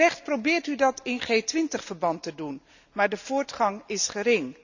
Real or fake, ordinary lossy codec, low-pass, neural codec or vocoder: real; none; 7.2 kHz; none